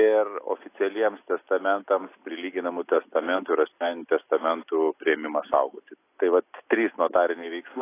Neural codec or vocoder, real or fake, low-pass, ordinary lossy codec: none; real; 3.6 kHz; AAC, 24 kbps